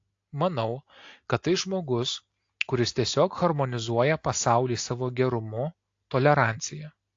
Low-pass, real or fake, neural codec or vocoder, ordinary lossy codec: 7.2 kHz; real; none; AAC, 48 kbps